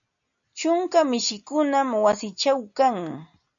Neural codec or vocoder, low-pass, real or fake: none; 7.2 kHz; real